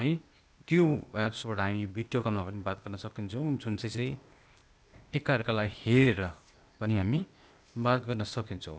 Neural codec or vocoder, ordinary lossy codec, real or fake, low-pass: codec, 16 kHz, 0.8 kbps, ZipCodec; none; fake; none